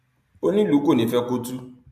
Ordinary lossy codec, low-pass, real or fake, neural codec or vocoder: AAC, 96 kbps; 14.4 kHz; real; none